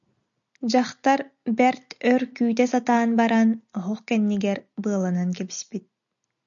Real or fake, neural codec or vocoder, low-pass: real; none; 7.2 kHz